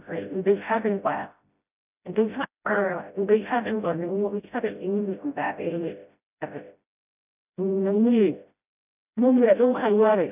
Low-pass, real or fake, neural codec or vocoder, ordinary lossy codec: 3.6 kHz; fake; codec, 16 kHz, 0.5 kbps, FreqCodec, smaller model; none